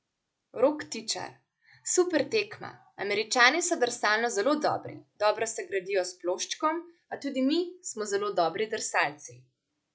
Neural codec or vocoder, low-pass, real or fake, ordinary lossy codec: none; none; real; none